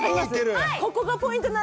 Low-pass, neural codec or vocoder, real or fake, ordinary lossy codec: none; none; real; none